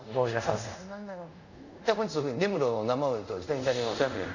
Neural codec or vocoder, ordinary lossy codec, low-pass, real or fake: codec, 24 kHz, 0.5 kbps, DualCodec; none; 7.2 kHz; fake